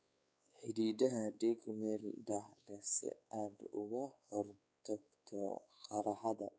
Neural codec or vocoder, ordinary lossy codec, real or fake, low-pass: codec, 16 kHz, 4 kbps, X-Codec, WavLM features, trained on Multilingual LibriSpeech; none; fake; none